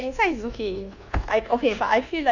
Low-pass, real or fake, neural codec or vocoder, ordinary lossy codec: 7.2 kHz; fake; codec, 24 kHz, 1.2 kbps, DualCodec; none